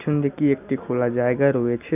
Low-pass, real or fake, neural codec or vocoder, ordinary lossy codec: 3.6 kHz; real; none; none